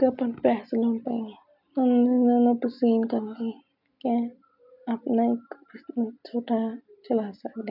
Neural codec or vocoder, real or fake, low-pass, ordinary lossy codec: none; real; 5.4 kHz; none